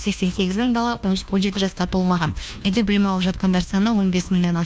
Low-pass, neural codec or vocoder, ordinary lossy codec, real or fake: none; codec, 16 kHz, 1 kbps, FunCodec, trained on LibriTTS, 50 frames a second; none; fake